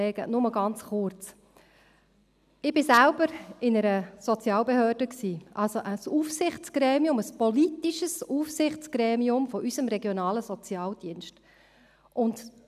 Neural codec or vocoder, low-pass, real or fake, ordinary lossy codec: none; 14.4 kHz; real; none